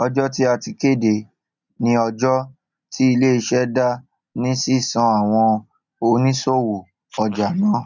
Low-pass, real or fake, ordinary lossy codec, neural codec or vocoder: 7.2 kHz; real; none; none